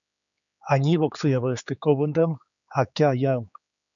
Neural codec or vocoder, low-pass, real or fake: codec, 16 kHz, 4 kbps, X-Codec, HuBERT features, trained on general audio; 7.2 kHz; fake